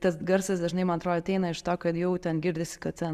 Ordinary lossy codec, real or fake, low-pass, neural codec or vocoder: Opus, 64 kbps; real; 14.4 kHz; none